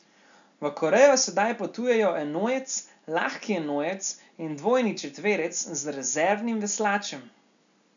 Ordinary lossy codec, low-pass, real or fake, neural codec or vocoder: none; 7.2 kHz; real; none